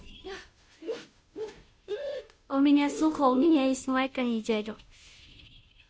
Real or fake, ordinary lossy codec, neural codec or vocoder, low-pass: fake; none; codec, 16 kHz, 0.5 kbps, FunCodec, trained on Chinese and English, 25 frames a second; none